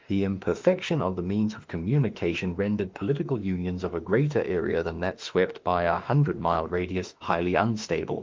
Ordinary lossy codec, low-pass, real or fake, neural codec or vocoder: Opus, 32 kbps; 7.2 kHz; fake; autoencoder, 48 kHz, 32 numbers a frame, DAC-VAE, trained on Japanese speech